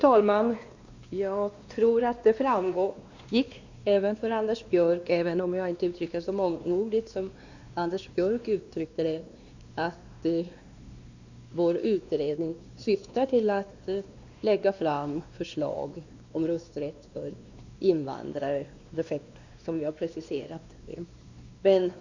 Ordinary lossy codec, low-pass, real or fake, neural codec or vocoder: Opus, 64 kbps; 7.2 kHz; fake; codec, 16 kHz, 2 kbps, X-Codec, WavLM features, trained on Multilingual LibriSpeech